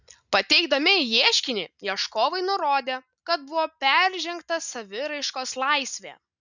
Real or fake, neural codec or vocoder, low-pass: real; none; 7.2 kHz